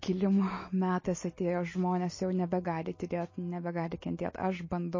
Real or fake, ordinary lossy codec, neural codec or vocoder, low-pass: real; MP3, 32 kbps; none; 7.2 kHz